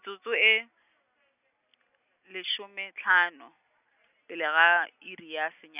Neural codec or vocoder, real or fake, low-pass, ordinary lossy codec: none; real; 3.6 kHz; none